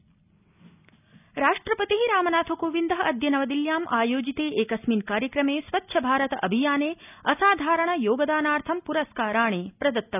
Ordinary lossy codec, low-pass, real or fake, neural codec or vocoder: none; 3.6 kHz; real; none